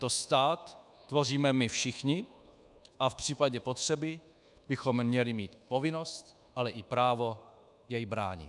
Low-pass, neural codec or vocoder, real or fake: 10.8 kHz; codec, 24 kHz, 1.2 kbps, DualCodec; fake